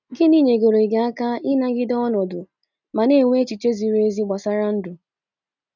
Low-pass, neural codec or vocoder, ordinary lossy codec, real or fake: 7.2 kHz; none; none; real